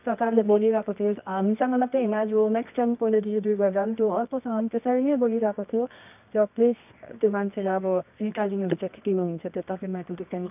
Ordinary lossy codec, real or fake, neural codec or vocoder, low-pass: none; fake; codec, 24 kHz, 0.9 kbps, WavTokenizer, medium music audio release; 3.6 kHz